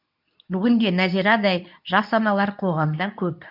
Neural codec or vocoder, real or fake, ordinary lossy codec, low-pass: codec, 24 kHz, 0.9 kbps, WavTokenizer, medium speech release version 2; fake; none; 5.4 kHz